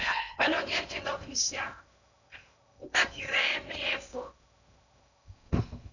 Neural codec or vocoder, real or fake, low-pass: codec, 16 kHz in and 24 kHz out, 0.8 kbps, FocalCodec, streaming, 65536 codes; fake; 7.2 kHz